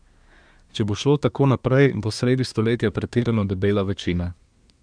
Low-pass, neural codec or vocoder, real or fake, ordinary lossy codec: 9.9 kHz; codec, 24 kHz, 1 kbps, SNAC; fake; none